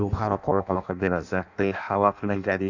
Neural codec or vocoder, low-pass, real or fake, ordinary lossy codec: codec, 16 kHz in and 24 kHz out, 0.6 kbps, FireRedTTS-2 codec; 7.2 kHz; fake; none